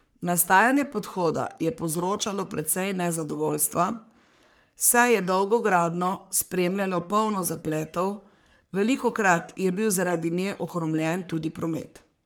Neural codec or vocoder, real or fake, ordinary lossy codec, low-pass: codec, 44.1 kHz, 3.4 kbps, Pupu-Codec; fake; none; none